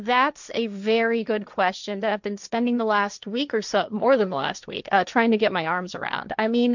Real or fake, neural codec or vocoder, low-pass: fake; codec, 16 kHz, 1.1 kbps, Voila-Tokenizer; 7.2 kHz